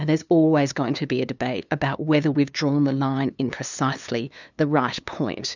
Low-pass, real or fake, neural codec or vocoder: 7.2 kHz; fake; codec, 16 kHz, 2 kbps, FunCodec, trained on LibriTTS, 25 frames a second